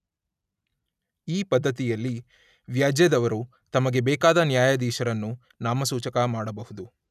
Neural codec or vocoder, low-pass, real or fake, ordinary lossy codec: none; 14.4 kHz; real; none